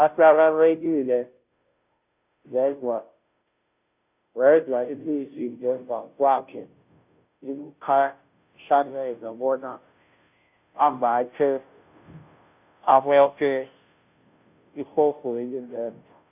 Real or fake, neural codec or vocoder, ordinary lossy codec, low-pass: fake; codec, 16 kHz, 0.5 kbps, FunCodec, trained on Chinese and English, 25 frames a second; none; 3.6 kHz